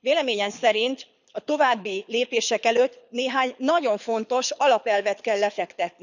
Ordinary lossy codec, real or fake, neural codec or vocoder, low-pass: none; fake; codec, 24 kHz, 6 kbps, HILCodec; 7.2 kHz